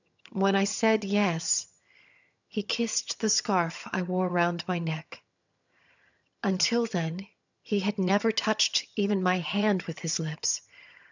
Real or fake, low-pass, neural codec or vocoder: fake; 7.2 kHz; vocoder, 22.05 kHz, 80 mel bands, HiFi-GAN